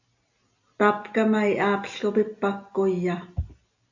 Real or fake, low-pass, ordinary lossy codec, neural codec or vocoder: real; 7.2 kHz; AAC, 48 kbps; none